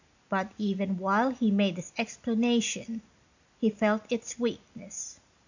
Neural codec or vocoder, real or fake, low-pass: none; real; 7.2 kHz